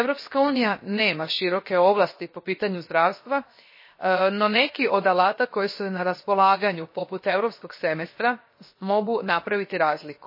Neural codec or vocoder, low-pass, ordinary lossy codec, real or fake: codec, 16 kHz, 0.7 kbps, FocalCodec; 5.4 kHz; MP3, 24 kbps; fake